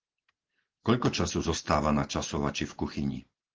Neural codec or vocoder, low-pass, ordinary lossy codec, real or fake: none; 7.2 kHz; Opus, 16 kbps; real